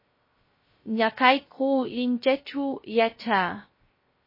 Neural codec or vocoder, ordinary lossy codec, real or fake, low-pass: codec, 16 kHz, 0.3 kbps, FocalCodec; MP3, 24 kbps; fake; 5.4 kHz